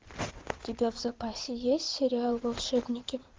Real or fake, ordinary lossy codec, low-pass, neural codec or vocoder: fake; Opus, 16 kbps; 7.2 kHz; codec, 16 kHz in and 24 kHz out, 2.2 kbps, FireRedTTS-2 codec